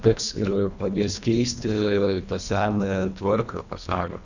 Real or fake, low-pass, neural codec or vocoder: fake; 7.2 kHz; codec, 24 kHz, 1.5 kbps, HILCodec